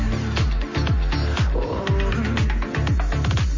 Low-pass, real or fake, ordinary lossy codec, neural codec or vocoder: 7.2 kHz; real; MP3, 32 kbps; none